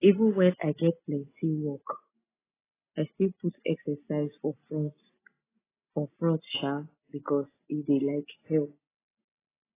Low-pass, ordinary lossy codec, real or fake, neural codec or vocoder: 3.6 kHz; AAC, 16 kbps; real; none